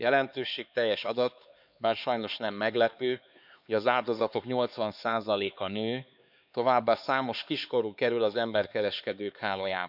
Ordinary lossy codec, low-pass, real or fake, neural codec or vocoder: none; 5.4 kHz; fake; codec, 16 kHz, 4 kbps, X-Codec, HuBERT features, trained on LibriSpeech